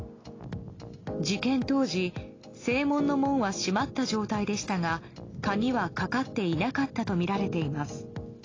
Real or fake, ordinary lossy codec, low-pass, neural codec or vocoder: real; AAC, 32 kbps; 7.2 kHz; none